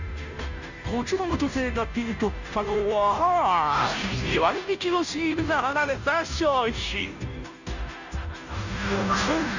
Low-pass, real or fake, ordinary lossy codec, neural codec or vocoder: 7.2 kHz; fake; none; codec, 16 kHz, 0.5 kbps, FunCodec, trained on Chinese and English, 25 frames a second